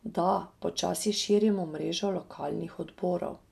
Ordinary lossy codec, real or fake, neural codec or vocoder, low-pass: none; real; none; 14.4 kHz